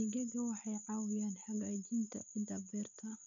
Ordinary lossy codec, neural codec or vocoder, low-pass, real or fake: none; none; 7.2 kHz; real